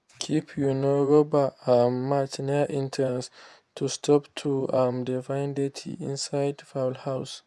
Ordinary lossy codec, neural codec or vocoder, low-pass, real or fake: none; vocoder, 24 kHz, 100 mel bands, Vocos; none; fake